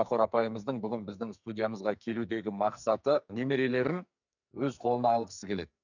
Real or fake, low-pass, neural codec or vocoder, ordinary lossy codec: fake; 7.2 kHz; codec, 44.1 kHz, 2.6 kbps, SNAC; none